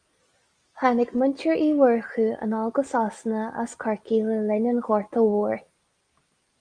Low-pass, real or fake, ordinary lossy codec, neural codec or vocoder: 9.9 kHz; real; Opus, 32 kbps; none